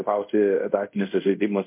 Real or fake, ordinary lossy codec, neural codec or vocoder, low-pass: fake; MP3, 32 kbps; codec, 24 kHz, 0.5 kbps, DualCodec; 3.6 kHz